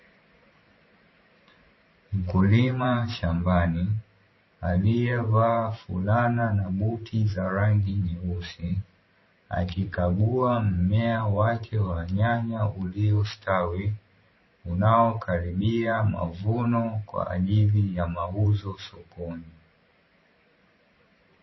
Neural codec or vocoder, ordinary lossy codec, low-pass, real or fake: vocoder, 44.1 kHz, 128 mel bands every 512 samples, BigVGAN v2; MP3, 24 kbps; 7.2 kHz; fake